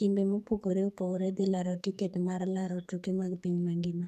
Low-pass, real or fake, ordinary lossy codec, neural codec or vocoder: 14.4 kHz; fake; none; codec, 44.1 kHz, 2.6 kbps, SNAC